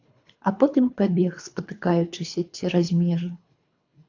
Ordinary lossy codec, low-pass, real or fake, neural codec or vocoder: AAC, 48 kbps; 7.2 kHz; fake; codec, 24 kHz, 3 kbps, HILCodec